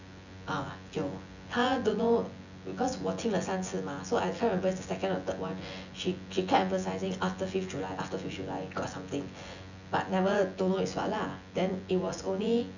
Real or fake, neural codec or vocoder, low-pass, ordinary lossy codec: fake; vocoder, 24 kHz, 100 mel bands, Vocos; 7.2 kHz; none